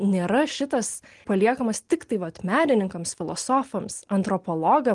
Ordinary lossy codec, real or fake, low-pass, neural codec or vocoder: Opus, 24 kbps; real; 10.8 kHz; none